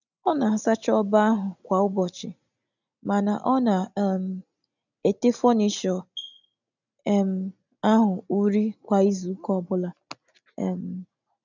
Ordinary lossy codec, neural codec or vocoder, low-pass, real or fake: none; none; 7.2 kHz; real